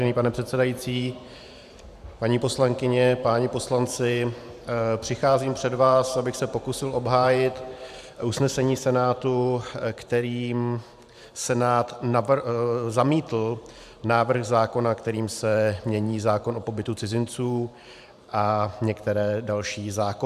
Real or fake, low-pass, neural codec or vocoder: fake; 14.4 kHz; vocoder, 44.1 kHz, 128 mel bands every 512 samples, BigVGAN v2